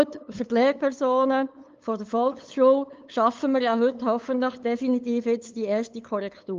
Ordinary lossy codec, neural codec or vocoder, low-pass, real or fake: Opus, 24 kbps; codec, 16 kHz, 8 kbps, FunCodec, trained on LibriTTS, 25 frames a second; 7.2 kHz; fake